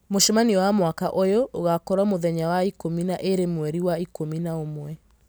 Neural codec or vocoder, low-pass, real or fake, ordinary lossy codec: none; none; real; none